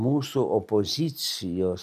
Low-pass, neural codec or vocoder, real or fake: 14.4 kHz; none; real